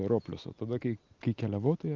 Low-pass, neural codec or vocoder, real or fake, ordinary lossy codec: 7.2 kHz; none; real; Opus, 32 kbps